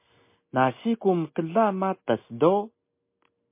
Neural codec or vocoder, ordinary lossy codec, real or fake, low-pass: none; MP3, 24 kbps; real; 3.6 kHz